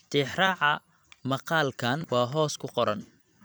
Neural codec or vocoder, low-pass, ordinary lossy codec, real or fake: vocoder, 44.1 kHz, 128 mel bands every 256 samples, BigVGAN v2; none; none; fake